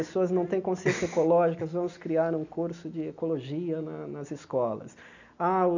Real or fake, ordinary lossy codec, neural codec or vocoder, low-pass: real; none; none; 7.2 kHz